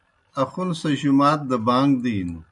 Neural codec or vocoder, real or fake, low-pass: none; real; 10.8 kHz